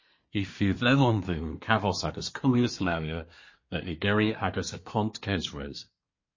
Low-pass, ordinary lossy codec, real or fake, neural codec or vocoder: 7.2 kHz; MP3, 32 kbps; fake; codec, 24 kHz, 1 kbps, SNAC